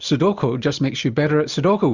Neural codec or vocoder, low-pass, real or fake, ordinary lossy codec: none; 7.2 kHz; real; Opus, 64 kbps